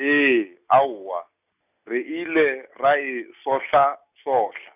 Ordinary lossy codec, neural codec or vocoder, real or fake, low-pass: none; none; real; 3.6 kHz